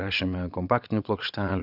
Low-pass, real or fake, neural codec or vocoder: 5.4 kHz; fake; vocoder, 22.05 kHz, 80 mel bands, Vocos